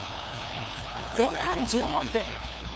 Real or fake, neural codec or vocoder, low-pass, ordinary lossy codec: fake; codec, 16 kHz, 2 kbps, FunCodec, trained on LibriTTS, 25 frames a second; none; none